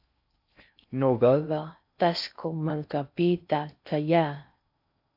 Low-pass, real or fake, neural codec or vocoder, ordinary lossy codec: 5.4 kHz; fake; codec, 16 kHz in and 24 kHz out, 0.6 kbps, FocalCodec, streaming, 4096 codes; AAC, 48 kbps